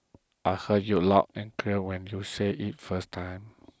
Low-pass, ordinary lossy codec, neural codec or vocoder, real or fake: none; none; none; real